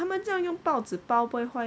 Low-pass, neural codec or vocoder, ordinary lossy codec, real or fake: none; none; none; real